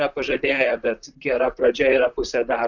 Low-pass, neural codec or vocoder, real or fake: 7.2 kHz; vocoder, 44.1 kHz, 128 mel bands, Pupu-Vocoder; fake